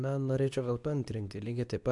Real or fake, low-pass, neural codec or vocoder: fake; 10.8 kHz; codec, 24 kHz, 0.9 kbps, WavTokenizer, medium speech release version 2